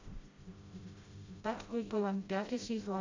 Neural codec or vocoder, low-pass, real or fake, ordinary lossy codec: codec, 16 kHz, 0.5 kbps, FreqCodec, smaller model; 7.2 kHz; fake; MP3, 48 kbps